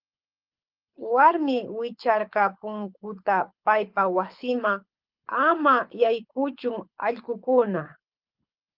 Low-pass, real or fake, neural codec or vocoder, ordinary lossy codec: 5.4 kHz; fake; codec, 24 kHz, 6 kbps, HILCodec; Opus, 32 kbps